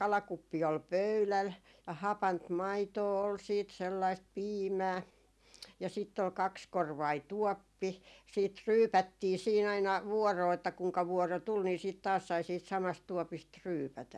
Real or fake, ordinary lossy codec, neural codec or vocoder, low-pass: real; none; none; none